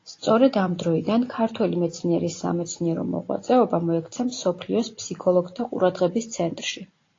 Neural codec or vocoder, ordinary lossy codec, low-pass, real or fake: none; AAC, 32 kbps; 7.2 kHz; real